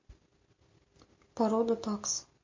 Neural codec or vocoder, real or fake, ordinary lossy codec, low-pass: none; real; MP3, 32 kbps; 7.2 kHz